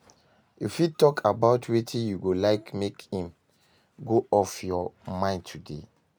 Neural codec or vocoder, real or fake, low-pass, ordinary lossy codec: none; real; none; none